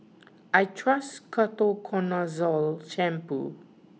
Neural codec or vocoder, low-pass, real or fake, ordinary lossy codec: none; none; real; none